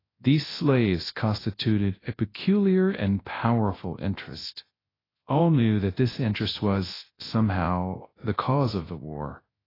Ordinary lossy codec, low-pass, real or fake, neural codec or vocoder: AAC, 24 kbps; 5.4 kHz; fake; codec, 24 kHz, 0.9 kbps, WavTokenizer, large speech release